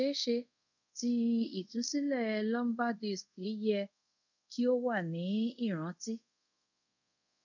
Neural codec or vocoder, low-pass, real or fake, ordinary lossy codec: codec, 24 kHz, 0.5 kbps, DualCodec; 7.2 kHz; fake; none